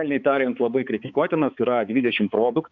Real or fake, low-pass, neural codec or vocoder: fake; 7.2 kHz; codec, 16 kHz, 4 kbps, X-Codec, HuBERT features, trained on balanced general audio